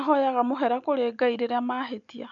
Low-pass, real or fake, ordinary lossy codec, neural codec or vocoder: 7.2 kHz; real; none; none